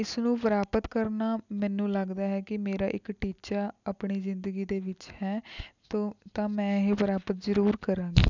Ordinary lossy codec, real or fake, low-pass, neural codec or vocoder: none; real; 7.2 kHz; none